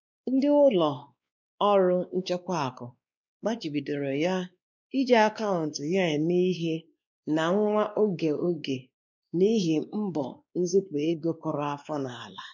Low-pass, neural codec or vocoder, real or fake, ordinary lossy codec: 7.2 kHz; codec, 16 kHz, 2 kbps, X-Codec, WavLM features, trained on Multilingual LibriSpeech; fake; none